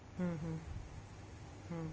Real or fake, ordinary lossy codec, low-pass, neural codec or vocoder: real; Opus, 24 kbps; 7.2 kHz; none